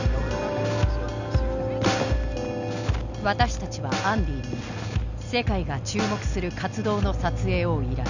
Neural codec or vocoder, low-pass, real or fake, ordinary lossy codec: none; 7.2 kHz; real; none